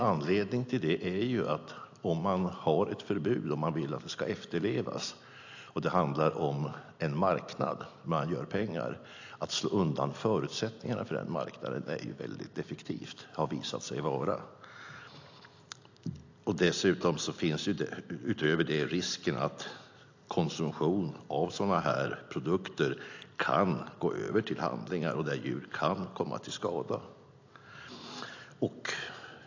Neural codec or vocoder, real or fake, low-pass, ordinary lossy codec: none; real; 7.2 kHz; AAC, 48 kbps